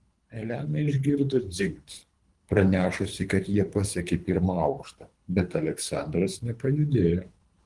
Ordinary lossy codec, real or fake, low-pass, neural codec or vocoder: Opus, 24 kbps; fake; 10.8 kHz; codec, 24 kHz, 3 kbps, HILCodec